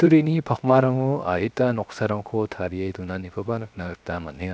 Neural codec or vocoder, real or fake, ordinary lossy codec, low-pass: codec, 16 kHz, 0.7 kbps, FocalCodec; fake; none; none